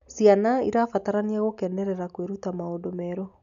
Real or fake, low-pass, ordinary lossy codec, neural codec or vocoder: real; 7.2 kHz; none; none